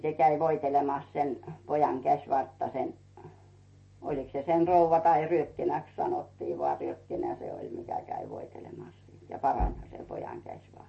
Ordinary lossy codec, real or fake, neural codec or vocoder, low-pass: MP3, 32 kbps; real; none; 9.9 kHz